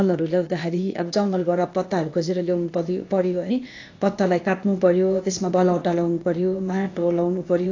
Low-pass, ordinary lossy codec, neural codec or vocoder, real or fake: 7.2 kHz; AAC, 48 kbps; codec, 16 kHz, 0.8 kbps, ZipCodec; fake